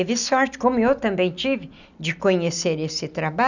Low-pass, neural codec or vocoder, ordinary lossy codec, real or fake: 7.2 kHz; none; none; real